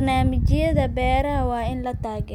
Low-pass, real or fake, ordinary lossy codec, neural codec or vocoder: 19.8 kHz; real; none; none